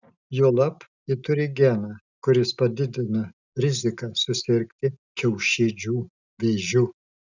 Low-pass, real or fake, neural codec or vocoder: 7.2 kHz; real; none